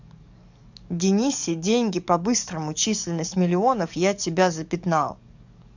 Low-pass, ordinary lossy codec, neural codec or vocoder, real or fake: 7.2 kHz; none; codec, 44.1 kHz, 7.8 kbps, DAC; fake